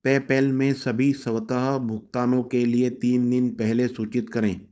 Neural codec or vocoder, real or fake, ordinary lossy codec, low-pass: codec, 16 kHz, 4.8 kbps, FACodec; fake; none; none